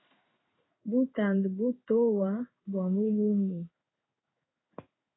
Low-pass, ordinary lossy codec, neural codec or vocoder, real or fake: 7.2 kHz; AAC, 16 kbps; codec, 16 kHz in and 24 kHz out, 1 kbps, XY-Tokenizer; fake